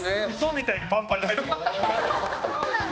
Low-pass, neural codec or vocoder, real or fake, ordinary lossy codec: none; codec, 16 kHz, 2 kbps, X-Codec, HuBERT features, trained on balanced general audio; fake; none